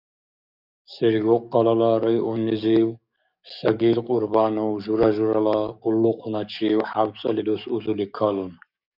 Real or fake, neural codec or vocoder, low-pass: fake; codec, 44.1 kHz, 7.8 kbps, DAC; 5.4 kHz